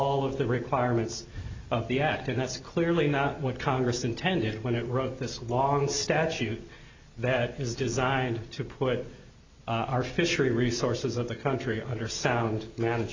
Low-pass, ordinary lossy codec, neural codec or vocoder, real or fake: 7.2 kHz; MP3, 64 kbps; none; real